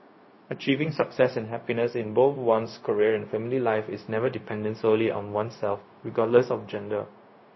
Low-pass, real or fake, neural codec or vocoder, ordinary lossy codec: 7.2 kHz; fake; codec, 16 kHz, 0.4 kbps, LongCat-Audio-Codec; MP3, 24 kbps